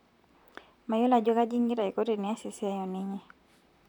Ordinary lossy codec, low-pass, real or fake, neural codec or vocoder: none; 19.8 kHz; fake; vocoder, 44.1 kHz, 128 mel bands, Pupu-Vocoder